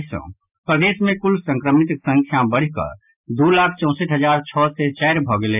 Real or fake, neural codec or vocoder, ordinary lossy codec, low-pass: real; none; none; 3.6 kHz